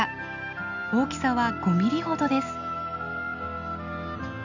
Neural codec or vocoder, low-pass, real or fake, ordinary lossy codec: none; 7.2 kHz; real; none